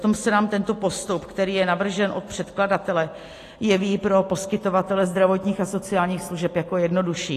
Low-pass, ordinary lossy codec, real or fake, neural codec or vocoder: 14.4 kHz; AAC, 48 kbps; real; none